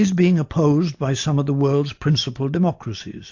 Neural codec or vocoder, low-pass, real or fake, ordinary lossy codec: none; 7.2 kHz; real; AAC, 48 kbps